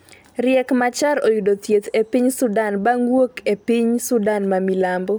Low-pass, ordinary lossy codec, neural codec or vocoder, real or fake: none; none; none; real